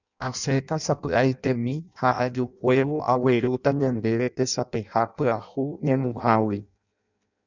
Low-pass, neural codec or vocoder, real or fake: 7.2 kHz; codec, 16 kHz in and 24 kHz out, 0.6 kbps, FireRedTTS-2 codec; fake